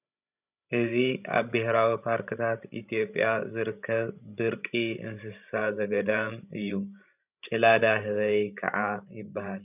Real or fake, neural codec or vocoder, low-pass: fake; codec, 16 kHz, 16 kbps, FreqCodec, larger model; 3.6 kHz